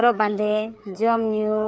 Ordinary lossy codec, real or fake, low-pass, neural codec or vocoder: none; fake; none; codec, 16 kHz, 4 kbps, FreqCodec, larger model